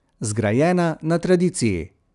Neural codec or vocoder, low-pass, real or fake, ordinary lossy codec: none; 10.8 kHz; real; none